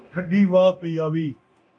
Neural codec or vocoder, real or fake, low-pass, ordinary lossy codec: codec, 24 kHz, 0.9 kbps, DualCodec; fake; 9.9 kHz; AAC, 64 kbps